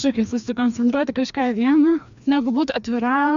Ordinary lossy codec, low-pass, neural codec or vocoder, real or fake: MP3, 64 kbps; 7.2 kHz; codec, 16 kHz, 2 kbps, FreqCodec, larger model; fake